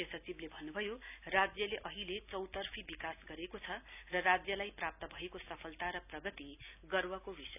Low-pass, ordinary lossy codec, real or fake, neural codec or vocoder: 3.6 kHz; none; real; none